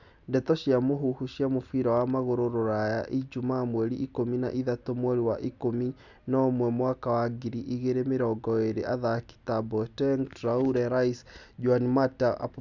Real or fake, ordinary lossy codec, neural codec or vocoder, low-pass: real; none; none; 7.2 kHz